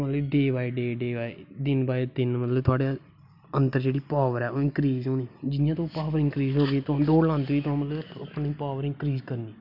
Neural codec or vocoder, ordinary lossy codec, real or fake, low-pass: none; none; real; 5.4 kHz